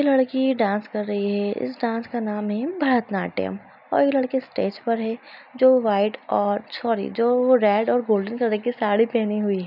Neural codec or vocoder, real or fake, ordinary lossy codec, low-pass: none; real; none; 5.4 kHz